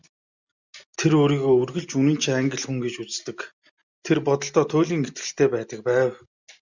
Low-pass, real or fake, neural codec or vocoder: 7.2 kHz; real; none